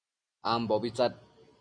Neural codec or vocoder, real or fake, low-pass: none; real; 9.9 kHz